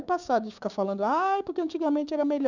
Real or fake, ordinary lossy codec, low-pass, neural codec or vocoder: fake; none; 7.2 kHz; codec, 16 kHz, 6 kbps, DAC